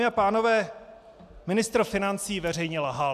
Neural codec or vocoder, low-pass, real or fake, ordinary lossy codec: none; 14.4 kHz; real; MP3, 96 kbps